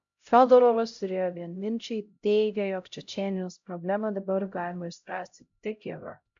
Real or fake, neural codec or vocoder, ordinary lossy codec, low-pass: fake; codec, 16 kHz, 0.5 kbps, X-Codec, HuBERT features, trained on LibriSpeech; MP3, 64 kbps; 7.2 kHz